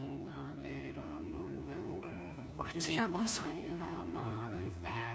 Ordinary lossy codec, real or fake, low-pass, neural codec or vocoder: none; fake; none; codec, 16 kHz, 1 kbps, FunCodec, trained on LibriTTS, 50 frames a second